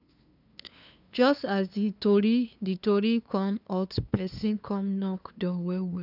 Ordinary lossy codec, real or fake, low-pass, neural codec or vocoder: none; fake; 5.4 kHz; codec, 16 kHz, 2 kbps, FunCodec, trained on LibriTTS, 25 frames a second